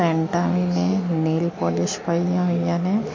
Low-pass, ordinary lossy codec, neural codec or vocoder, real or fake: 7.2 kHz; MP3, 32 kbps; none; real